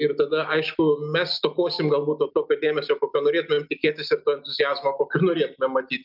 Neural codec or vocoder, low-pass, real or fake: none; 5.4 kHz; real